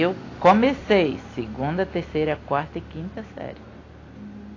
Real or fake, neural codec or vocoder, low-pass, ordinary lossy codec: real; none; 7.2 kHz; AAC, 32 kbps